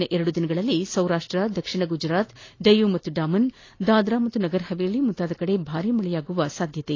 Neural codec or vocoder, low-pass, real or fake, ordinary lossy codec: none; 7.2 kHz; real; AAC, 32 kbps